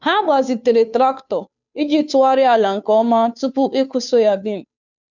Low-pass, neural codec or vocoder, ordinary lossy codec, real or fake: 7.2 kHz; codec, 16 kHz, 2 kbps, FunCodec, trained on Chinese and English, 25 frames a second; none; fake